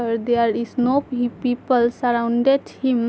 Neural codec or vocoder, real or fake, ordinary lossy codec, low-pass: none; real; none; none